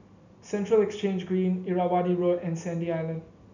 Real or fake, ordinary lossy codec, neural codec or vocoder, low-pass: real; none; none; 7.2 kHz